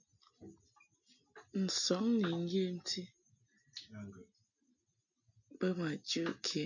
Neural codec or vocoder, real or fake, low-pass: none; real; 7.2 kHz